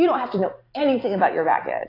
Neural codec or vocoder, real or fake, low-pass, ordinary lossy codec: vocoder, 44.1 kHz, 128 mel bands every 512 samples, BigVGAN v2; fake; 5.4 kHz; AAC, 24 kbps